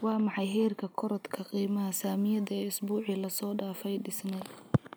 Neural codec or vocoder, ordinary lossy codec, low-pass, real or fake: none; none; none; real